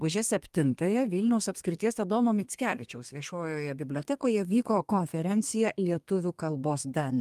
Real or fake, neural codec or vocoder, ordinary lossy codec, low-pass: fake; codec, 32 kHz, 1.9 kbps, SNAC; Opus, 32 kbps; 14.4 kHz